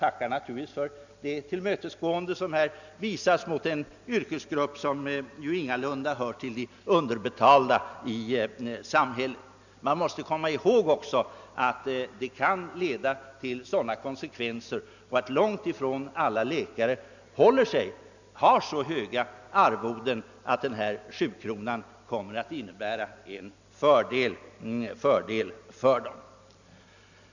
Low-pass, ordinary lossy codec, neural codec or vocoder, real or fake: 7.2 kHz; none; none; real